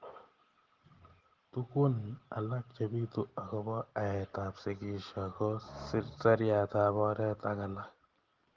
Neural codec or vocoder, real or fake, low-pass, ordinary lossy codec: none; real; 7.2 kHz; Opus, 16 kbps